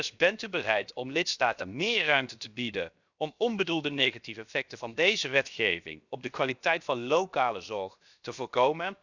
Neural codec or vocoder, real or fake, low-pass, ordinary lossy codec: codec, 16 kHz, 0.7 kbps, FocalCodec; fake; 7.2 kHz; none